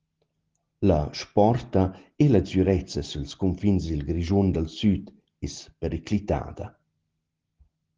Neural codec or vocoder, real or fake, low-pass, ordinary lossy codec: none; real; 7.2 kHz; Opus, 16 kbps